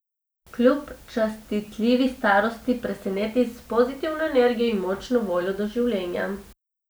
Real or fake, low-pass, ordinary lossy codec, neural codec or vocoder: real; none; none; none